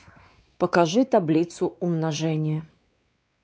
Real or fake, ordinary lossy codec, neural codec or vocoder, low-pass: fake; none; codec, 16 kHz, 4 kbps, X-Codec, WavLM features, trained on Multilingual LibriSpeech; none